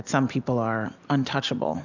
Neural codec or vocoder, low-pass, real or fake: none; 7.2 kHz; real